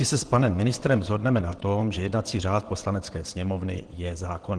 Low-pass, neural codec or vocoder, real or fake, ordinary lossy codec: 9.9 kHz; vocoder, 22.05 kHz, 80 mel bands, WaveNeXt; fake; Opus, 16 kbps